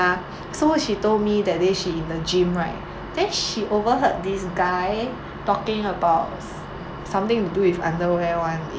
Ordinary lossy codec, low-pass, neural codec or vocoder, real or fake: none; none; none; real